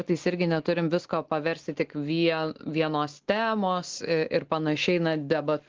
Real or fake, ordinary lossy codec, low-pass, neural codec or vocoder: real; Opus, 16 kbps; 7.2 kHz; none